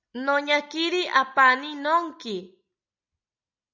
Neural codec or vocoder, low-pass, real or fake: none; 7.2 kHz; real